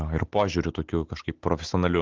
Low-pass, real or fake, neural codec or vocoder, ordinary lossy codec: 7.2 kHz; real; none; Opus, 16 kbps